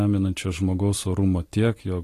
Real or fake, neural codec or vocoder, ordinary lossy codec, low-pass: real; none; AAC, 48 kbps; 14.4 kHz